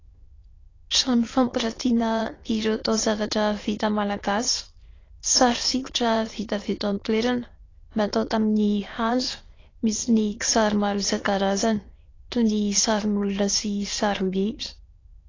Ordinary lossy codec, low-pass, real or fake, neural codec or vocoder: AAC, 32 kbps; 7.2 kHz; fake; autoencoder, 22.05 kHz, a latent of 192 numbers a frame, VITS, trained on many speakers